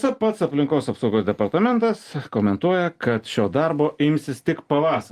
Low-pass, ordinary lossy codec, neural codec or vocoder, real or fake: 14.4 kHz; Opus, 24 kbps; none; real